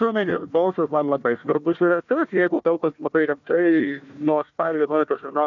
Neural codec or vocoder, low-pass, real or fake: codec, 16 kHz, 1 kbps, FunCodec, trained on Chinese and English, 50 frames a second; 7.2 kHz; fake